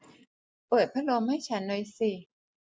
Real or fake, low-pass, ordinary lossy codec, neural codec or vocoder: real; none; none; none